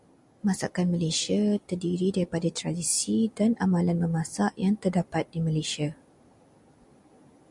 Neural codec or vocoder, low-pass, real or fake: none; 10.8 kHz; real